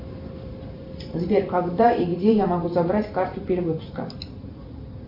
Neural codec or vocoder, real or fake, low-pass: vocoder, 44.1 kHz, 128 mel bands every 512 samples, BigVGAN v2; fake; 5.4 kHz